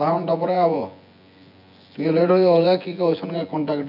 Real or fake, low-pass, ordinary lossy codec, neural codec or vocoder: fake; 5.4 kHz; none; vocoder, 24 kHz, 100 mel bands, Vocos